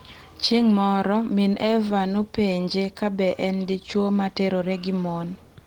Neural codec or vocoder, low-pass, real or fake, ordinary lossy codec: none; 19.8 kHz; real; Opus, 16 kbps